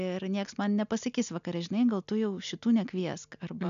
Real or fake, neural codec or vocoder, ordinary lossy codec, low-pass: real; none; MP3, 96 kbps; 7.2 kHz